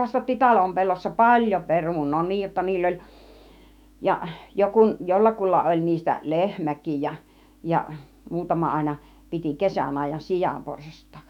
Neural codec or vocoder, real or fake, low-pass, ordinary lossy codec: autoencoder, 48 kHz, 128 numbers a frame, DAC-VAE, trained on Japanese speech; fake; 19.8 kHz; none